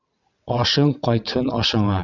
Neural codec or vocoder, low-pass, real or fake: vocoder, 22.05 kHz, 80 mel bands, WaveNeXt; 7.2 kHz; fake